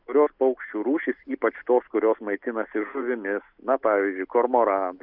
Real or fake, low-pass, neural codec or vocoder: real; 5.4 kHz; none